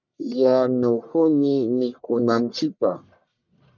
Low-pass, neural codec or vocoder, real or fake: 7.2 kHz; codec, 44.1 kHz, 1.7 kbps, Pupu-Codec; fake